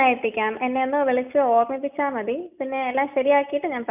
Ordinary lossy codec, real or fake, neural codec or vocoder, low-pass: none; real; none; 3.6 kHz